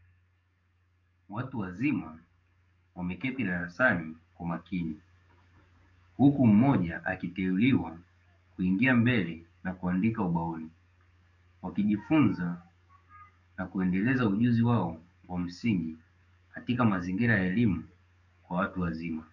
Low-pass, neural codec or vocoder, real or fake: 7.2 kHz; codec, 44.1 kHz, 7.8 kbps, DAC; fake